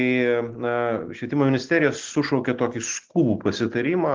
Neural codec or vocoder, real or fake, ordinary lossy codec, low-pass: none; real; Opus, 16 kbps; 7.2 kHz